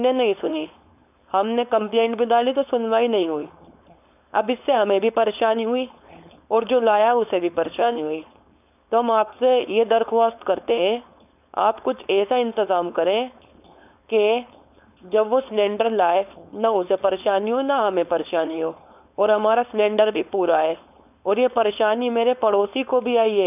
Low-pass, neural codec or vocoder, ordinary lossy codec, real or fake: 3.6 kHz; codec, 16 kHz, 4.8 kbps, FACodec; none; fake